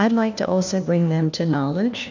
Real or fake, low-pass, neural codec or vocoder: fake; 7.2 kHz; codec, 16 kHz, 1 kbps, FunCodec, trained on LibriTTS, 50 frames a second